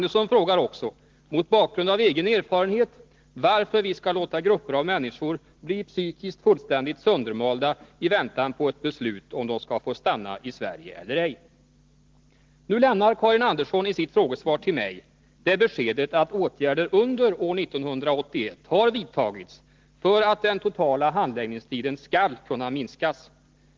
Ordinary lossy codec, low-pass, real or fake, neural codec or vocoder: Opus, 16 kbps; 7.2 kHz; real; none